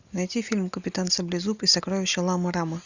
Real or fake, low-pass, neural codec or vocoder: real; 7.2 kHz; none